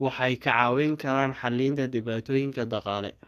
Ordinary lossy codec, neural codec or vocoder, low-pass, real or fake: none; codec, 44.1 kHz, 2.6 kbps, SNAC; 14.4 kHz; fake